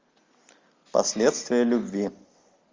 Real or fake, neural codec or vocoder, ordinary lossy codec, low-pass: real; none; Opus, 32 kbps; 7.2 kHz